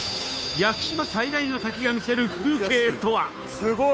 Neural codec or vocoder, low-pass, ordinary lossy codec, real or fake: codec, 16 kHz, 2 kbps, FunCodec, trained on Chinese and English, 25 frames a second; none; none; fake